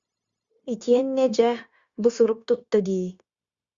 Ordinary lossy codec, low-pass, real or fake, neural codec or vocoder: Opus, 64 kbps; 7.2 kHz; fake; codec, 16 kHz, 0.9 kbps, LongCat-Audio-Codec